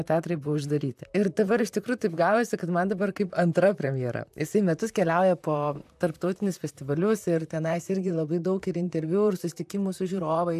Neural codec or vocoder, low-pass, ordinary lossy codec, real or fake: vocoder, 44.1 kHz, 128 mel bands, Pupu-Vocoder; 14.4 kHz; AAC, 96 kbps; fake